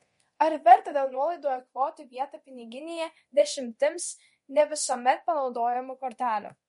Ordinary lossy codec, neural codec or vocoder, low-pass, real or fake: MP3, 48 kbps; codec, 24 kHz, 0.9 kbps, DualCodec; 10.8 kHz; fake